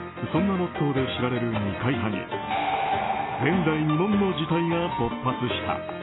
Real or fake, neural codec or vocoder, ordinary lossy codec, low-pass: real; none; AAC, 16 kbps; 7.2 kHz